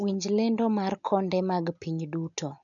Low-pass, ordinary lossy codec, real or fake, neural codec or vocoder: 7.2 kHz; none; real; none